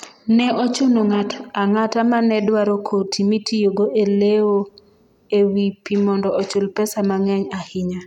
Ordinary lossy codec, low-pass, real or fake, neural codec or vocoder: none; 19.8 kHz; real; none